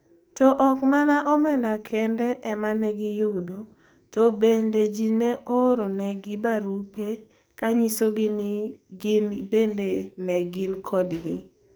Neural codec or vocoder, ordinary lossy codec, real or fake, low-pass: codec, 44.1 kHz, 2.6 kbps, SNAC; none; fake; none